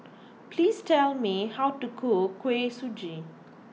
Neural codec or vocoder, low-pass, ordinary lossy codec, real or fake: none; none; none; real